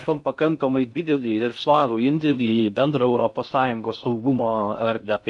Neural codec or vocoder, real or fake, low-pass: codec, 16 kHz in and 24 kHz out, 0.6 kbps, FocalCodec, streaming, 4096 codes; fake; 10.8 kHz